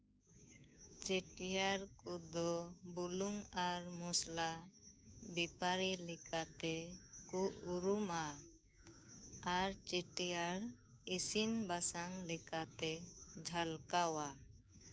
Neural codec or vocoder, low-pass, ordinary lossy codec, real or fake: codec, 16 kHz, 6 kbps, DAC; none; none; fake